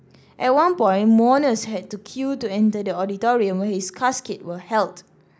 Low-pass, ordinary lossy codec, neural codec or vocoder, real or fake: none; none; none; real